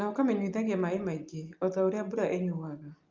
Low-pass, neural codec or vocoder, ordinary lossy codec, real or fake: 7.2 kHz; none; Opus, 32 kbps; real